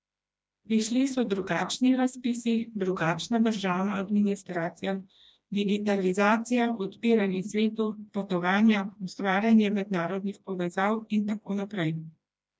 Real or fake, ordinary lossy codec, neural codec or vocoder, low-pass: fake; none; codec, 16 kHz, 1 kbps, FreqCodec, smaller model; none